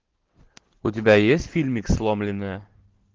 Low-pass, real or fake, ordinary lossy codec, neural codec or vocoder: 7.2 kHz; real; Opus, 16 kbps; none